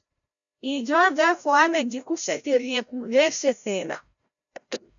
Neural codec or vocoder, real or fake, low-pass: codec, 16 kHz, 0.5 kbps, FreqCodec, larger model; fake; 7.2 kHz